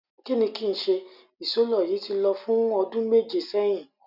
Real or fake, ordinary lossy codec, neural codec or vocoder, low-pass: real; none; none; 5.4 kHz